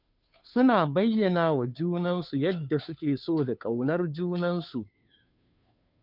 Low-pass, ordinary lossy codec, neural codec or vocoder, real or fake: 5.4 kHz; none; codec, 16 kHz, 2 kbps, FunCodec, trained on Chinese and English, 25 frames a second; fake